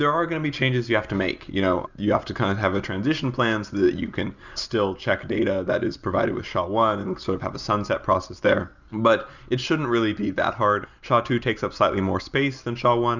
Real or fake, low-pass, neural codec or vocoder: real; 7.2 kHz; none